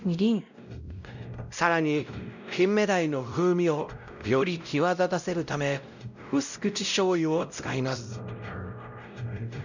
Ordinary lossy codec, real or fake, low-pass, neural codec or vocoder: none; fake; 7.2 kHz; codec, 16 kHz, 0.5 kbps, X-Codec, WavLM features, trained on Multilingual LibriSpeech